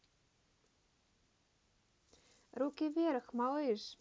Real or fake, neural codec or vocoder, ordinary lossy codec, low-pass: real; none; none; none